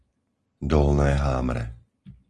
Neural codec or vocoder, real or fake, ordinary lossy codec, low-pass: none; real; Opus, 24 kbps; 10.8 kHz